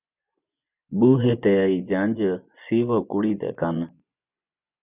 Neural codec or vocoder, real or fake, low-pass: vocoder, 24 kHz, 100 mel bands, Vocos; fake; 3.6 kHz